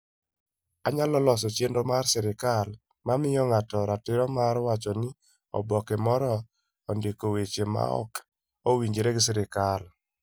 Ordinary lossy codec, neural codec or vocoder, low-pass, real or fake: none; none; none; real